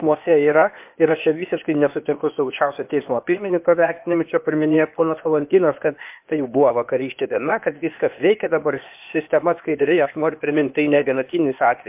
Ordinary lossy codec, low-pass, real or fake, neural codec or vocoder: MP3, 32 kbps; 3.6 kHz; fake; codec, 16 kHz, 0.8 kbps, ZipCodec